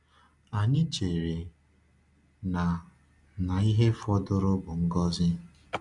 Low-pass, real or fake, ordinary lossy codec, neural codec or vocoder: 10.8 kHz; real; none; none